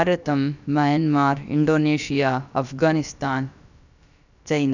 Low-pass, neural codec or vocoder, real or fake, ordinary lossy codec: 7.2 kHz; codec, 16 kHz, about 1 kbps, DyCAST, with the encoder's durations; fake; none